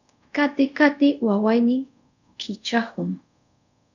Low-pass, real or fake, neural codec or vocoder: 7.2 kHz; fake; codec, 24 kHz, 0.5 kbps, DualCodec